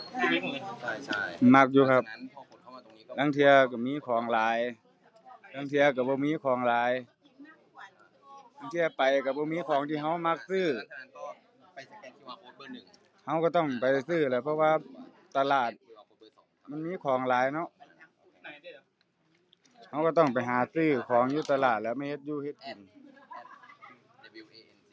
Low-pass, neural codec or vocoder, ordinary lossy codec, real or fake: none; none; none; real